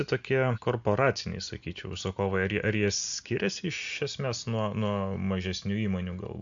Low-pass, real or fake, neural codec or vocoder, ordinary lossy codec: 7.2 kHz; real; none; MP3, 64 kbps